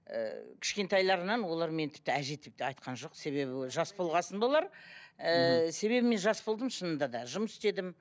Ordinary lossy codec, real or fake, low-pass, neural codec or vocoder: none; real; none; none